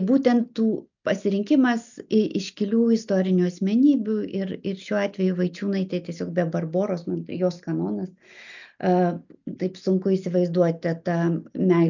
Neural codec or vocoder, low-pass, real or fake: none; 7.2 kHz; real